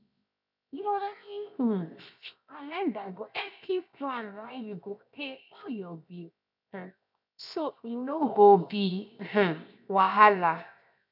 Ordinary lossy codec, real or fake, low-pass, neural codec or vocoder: none; fake; 5.4 kHz; codec, 16 kHz, 0.7 kbps, FocalCodec